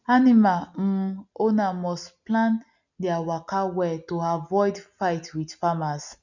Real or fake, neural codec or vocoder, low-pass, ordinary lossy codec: real; none; 7.2 kHz; none